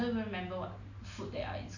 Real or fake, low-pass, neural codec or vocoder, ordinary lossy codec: real; 7.2 kHz; none; none